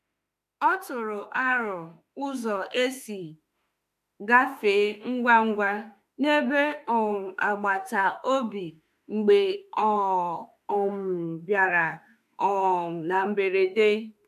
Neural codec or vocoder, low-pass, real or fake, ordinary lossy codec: autoencoder, 48 kHz, 32 numbers a frame, DAC-VAE, trained on Japanese speech; 14.4 kHz; fake; AAC, 96 kbps